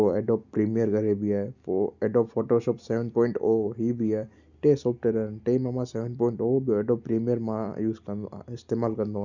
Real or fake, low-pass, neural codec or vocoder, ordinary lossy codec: real; 7.2 kHz; none; none